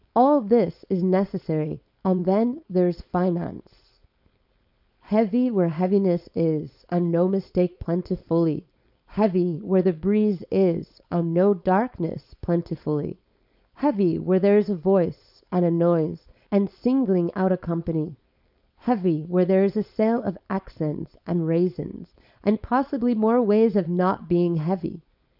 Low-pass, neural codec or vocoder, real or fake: 5.4 kHz; codec, 16 kHz, 4.8 kbps, FACodec; fake